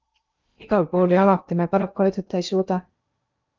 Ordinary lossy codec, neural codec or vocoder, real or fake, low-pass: Opus, 24 kbps; codec, 16 kHz in and 24 kHz out, 0.8 kbps, FocalCodec, streaming, 65536 codes; fake; 7.2 kHz